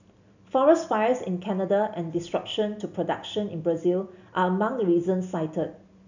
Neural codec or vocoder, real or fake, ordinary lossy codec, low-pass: none; real; none; 7.2 kHz